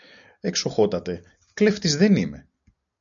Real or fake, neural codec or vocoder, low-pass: real; none; 7.2 kHz